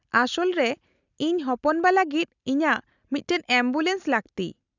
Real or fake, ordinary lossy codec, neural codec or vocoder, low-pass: real; none; none; 7.2 kHz